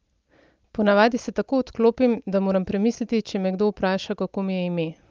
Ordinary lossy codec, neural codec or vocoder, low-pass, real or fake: Opus, 32 kbps; none; 7.2 kHz; real